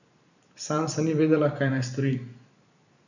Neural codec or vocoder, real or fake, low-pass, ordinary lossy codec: vocoder, 44.1 kHz, 128 mel bands every 512 samples, BigVGAN v2; fake; 7.2 kHz; none